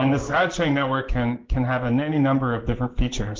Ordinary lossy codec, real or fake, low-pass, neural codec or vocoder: Opus, 16 kbps; fake; 7.2 kHz; codec, 16 kHz in and 24 kHz out, 1 kbps, XY-Tokenizer